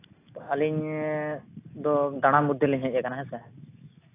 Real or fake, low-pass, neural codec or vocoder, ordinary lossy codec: real; 3.6 kHz; none; AAC, 24 kbps